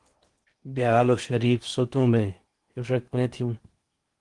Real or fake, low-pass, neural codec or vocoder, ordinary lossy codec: fake; 10.8 kHz; codec, 16 kHz in and 24 kHz out, 0.8 kbps, FocalCodec, streaming, 65536 codes; Opus, 24 kbps